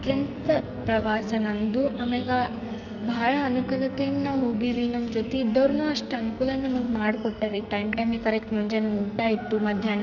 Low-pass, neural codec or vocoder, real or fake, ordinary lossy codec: 7.2 kHz; codec, 44.1 kHz, 2.6 kbps, SNAC; fake; none